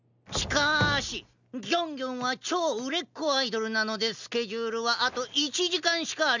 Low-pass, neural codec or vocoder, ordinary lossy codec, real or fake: 7.2 kHz; none; none; real